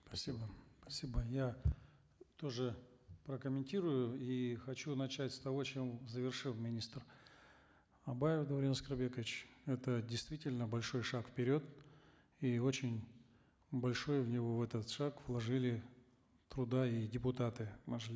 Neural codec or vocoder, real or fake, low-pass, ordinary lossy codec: none; real; none; none